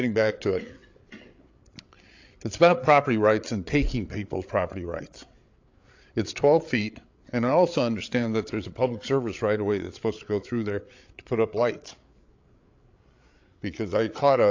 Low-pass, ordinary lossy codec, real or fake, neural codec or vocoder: 7.2 kHz; AAC, 48 kbps; fake; codec, 16 kHz, 8 kbps, FreqCodec, larger model